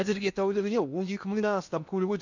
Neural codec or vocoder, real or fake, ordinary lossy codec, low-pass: codec, 16 kHz in and 24 kHz out, 0.6 kbps, FocalCodec, streaming, 2048 codes; fake; none; 7.2 kHz